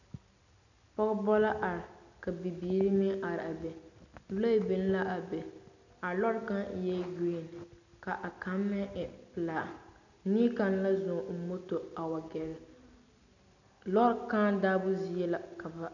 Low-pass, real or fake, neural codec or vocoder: 7.2 kHz; real; none